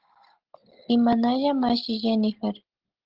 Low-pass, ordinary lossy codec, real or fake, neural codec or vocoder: 5.4 kHz; Opus, 16 kbps; real; none